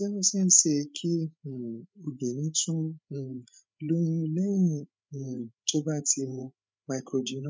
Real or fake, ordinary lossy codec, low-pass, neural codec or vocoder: fake; none; none; codec, 16 kHz, 8 kbps, FreqCodec, larger model